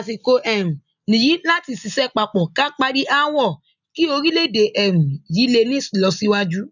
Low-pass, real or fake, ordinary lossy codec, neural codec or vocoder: 7.2 kHz; real; none; none